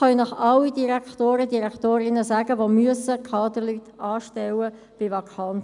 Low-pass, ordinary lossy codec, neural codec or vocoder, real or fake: 10.8 kHz; none; none; real